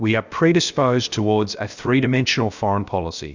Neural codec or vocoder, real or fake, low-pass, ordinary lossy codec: codec, 16 kHz, 0.7 kbps, FocalCodec; fake; 7.2 kHz; Opus, 64 kbps